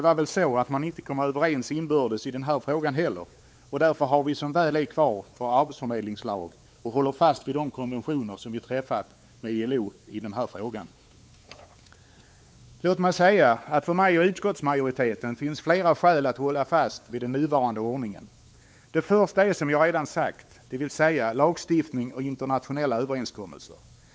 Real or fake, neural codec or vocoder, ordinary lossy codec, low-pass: fake; codec, 16 kHz, 4 kbps, X-Codec, WavLM features, trained on Multilingual LibriSpeech; none; none